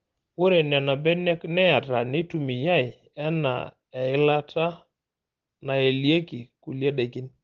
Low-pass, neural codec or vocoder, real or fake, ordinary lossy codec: 7.2 kHz; none; real; Opus, 16 kbps